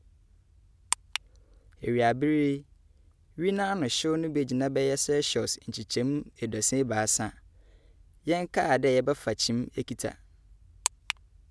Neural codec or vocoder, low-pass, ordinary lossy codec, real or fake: none; none; none; real